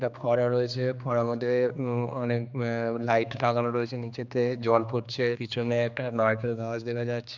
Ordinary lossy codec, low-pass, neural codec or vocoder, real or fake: none; 7.2 kHz; codec, 16 kHz, 2 kbps, X-Codec, HuBERT features, trained on general audio; fake